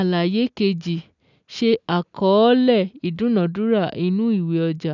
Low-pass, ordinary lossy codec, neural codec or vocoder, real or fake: 7.2 kHz; none; none; real